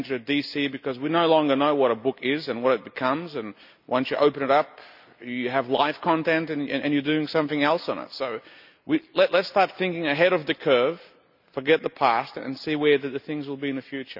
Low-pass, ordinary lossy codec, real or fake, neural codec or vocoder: 5.4 kHz; none; real; none